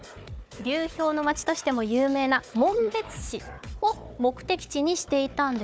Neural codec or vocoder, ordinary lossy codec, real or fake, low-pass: codec, 16 kHz, 4 kbps, FunCodec, trained on Chinese and English, 50 frames a second; none; fake; none